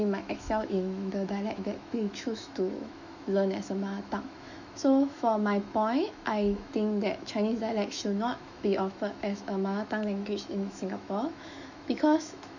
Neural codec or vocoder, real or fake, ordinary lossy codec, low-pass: autoencoder, 48 kHz, 128 numbers a frame, DAC-VAE, trained on Japanese speech; fake; none; 7.2 kHz